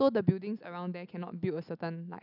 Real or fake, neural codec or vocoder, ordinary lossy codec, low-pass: real; none; none; 5.4 kHz